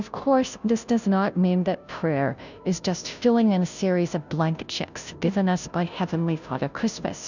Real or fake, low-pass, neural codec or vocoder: fake; 7.2 kHz; codec, 16 kHz, 0.5 kbps, FunCodec, trained on Chinese and English, 25 frames a second